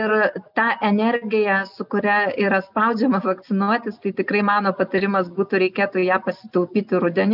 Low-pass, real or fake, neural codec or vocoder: 5.4 kHz; real; none